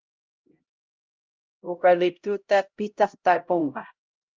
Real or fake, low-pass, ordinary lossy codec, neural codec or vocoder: fake; 7.2 kHz; Opus, 24 kbps; codec, 16 kHz, 0.5 kbps, X-Codec, HuBERT features, trained on LibriSpeech